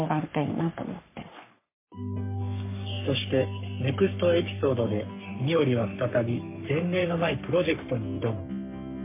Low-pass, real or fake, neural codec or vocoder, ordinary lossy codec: 3.6 kHz; fake; codec, 44.1 kHz, 3.4 kbps, Pupu-Codec; MP3, 24 kbps